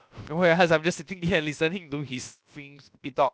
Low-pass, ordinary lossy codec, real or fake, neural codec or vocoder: none; none; fake; codec, 16 kHz, about 1 kbps, DyCAST, with the encoder's durations